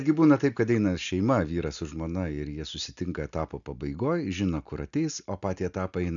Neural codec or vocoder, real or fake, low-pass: none; real; 7.2 kHz